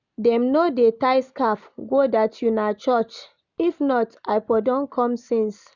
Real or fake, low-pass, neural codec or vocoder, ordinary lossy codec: real; 7.2 kHz; none; none